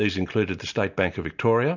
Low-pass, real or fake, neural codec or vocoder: 7.2 kHz; real; none